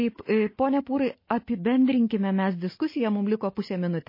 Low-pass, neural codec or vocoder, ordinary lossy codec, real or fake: 5.4 kHz; none; MP3, 24 kbps; real